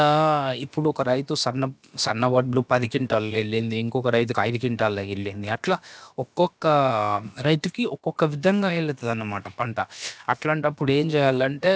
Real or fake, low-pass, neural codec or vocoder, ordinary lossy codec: fake; none; codec, 16 kHz, about 1 kbps, DyCAST, with the encoder's durations; none